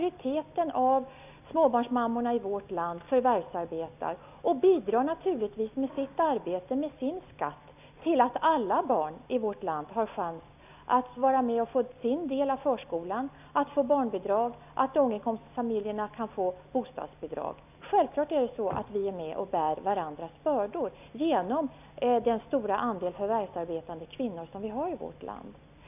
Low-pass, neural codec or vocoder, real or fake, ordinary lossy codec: 3.6 kHz; none; real; none